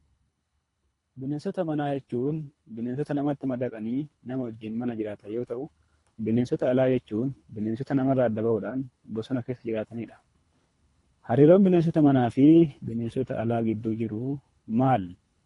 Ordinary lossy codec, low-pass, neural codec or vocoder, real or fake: AAC, 48 kbps; 10.8 kHz; codec, 24 kHz, 3 kbps, HILCodec; fake